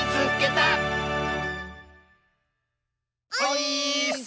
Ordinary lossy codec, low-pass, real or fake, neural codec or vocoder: none; none; real; none